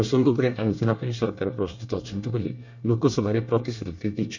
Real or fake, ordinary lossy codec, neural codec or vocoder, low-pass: fake; none; codec, 24 kHz, 1 kbps, SNAC; 7.2 kHz